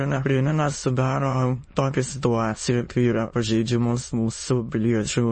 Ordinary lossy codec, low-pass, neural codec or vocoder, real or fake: MP3, 32 kbps; 9.9 kHz; autoencoder, 22.05 kHz, a latent of 192 numbers a frame, VITS, trained on many speakers; fake